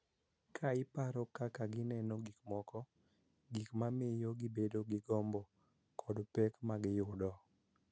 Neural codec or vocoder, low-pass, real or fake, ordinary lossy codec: none; none; real; none